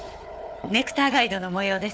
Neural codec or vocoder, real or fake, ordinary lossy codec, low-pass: codec, 16 kHz, 4 kbps, FunCodec, trained on Chinese and English, 50 frames a second; fake; none; none